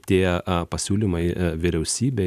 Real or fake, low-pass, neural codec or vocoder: real; 14.4 kHz; none